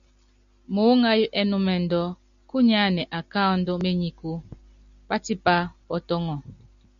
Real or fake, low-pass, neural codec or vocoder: real; 7.2 kHz; none